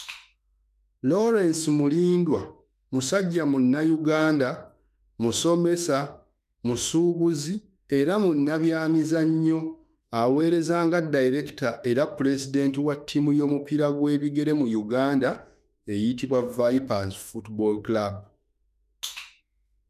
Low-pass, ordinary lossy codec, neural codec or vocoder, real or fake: 14.4 kHz; none; autoencoder, 48 kHz, 32 numbers a frame, DAC-VAE, trained on Japanese speech; fake